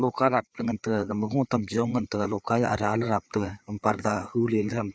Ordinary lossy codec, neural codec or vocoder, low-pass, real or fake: none; codec, 16 kHz, 4 kbps, FreqCodec, larger model; none; fake